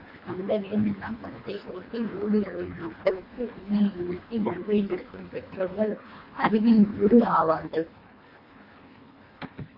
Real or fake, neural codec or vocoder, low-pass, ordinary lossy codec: fake; codec, 24 kHz, 1.5 kbps, HILCodec; 5.4 kHz; MP3, 32 kbps